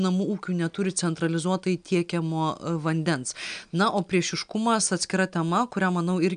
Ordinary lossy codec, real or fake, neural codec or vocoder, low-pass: AAC, 96 kbps; real; none; 9.9 kHz